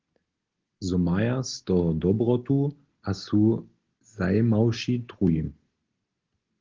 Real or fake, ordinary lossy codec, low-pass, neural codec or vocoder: real; Opus, 16 kbps; 7.2 kHz; none